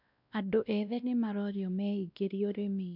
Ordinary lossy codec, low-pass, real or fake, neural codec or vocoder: none; 5.4 kHz; fake; codec, 16 kHz, 1 kbps, X-Codec, WavLM features, trained on Multilingual LibriSpeech